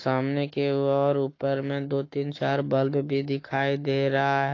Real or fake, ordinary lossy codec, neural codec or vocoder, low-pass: fake; AAC, 32 kbps; codec, 44.1 kHz, 7.8 kbps, Pupu-Codec; 7.2 kHz